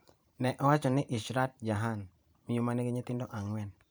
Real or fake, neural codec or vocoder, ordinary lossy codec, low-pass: fake; vocoder, 44.1 kHz, 128 mel bands every 512 samples, BigVGAN v2; none; none